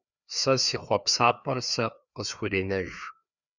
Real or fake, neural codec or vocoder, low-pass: fake; codec, 16 kHz, 2 kbps, FreqCodec, larger model; 7.2 kHz